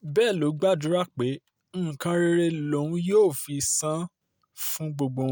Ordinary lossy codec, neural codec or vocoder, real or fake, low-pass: none; none; real; none